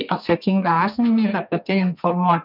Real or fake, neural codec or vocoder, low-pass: fake; codec, 32 kHz, 1.9 kbps, SNAC; 5.4 kHz